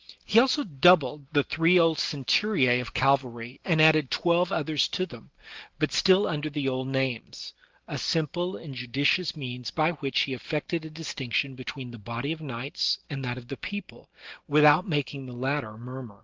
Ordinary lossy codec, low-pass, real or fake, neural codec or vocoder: Opus, 16 kbps; 7.2 kHz; real; none